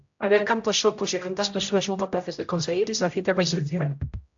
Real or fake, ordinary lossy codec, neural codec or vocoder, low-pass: fake; AAC, 64 kbps; codec, 16 kHz, 0.5 kbps, X-Codec, HuBERT features, trained on general audio; 7.2 kHz